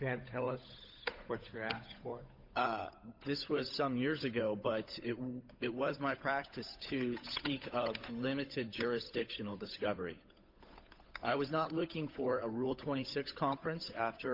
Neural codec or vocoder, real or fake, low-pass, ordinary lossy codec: codec, 16 kHz, 8 kbps, FunCodec, trained on Chinese and English, 25 frames a second; fake; 5.4 kHz; MP3, 48 kbps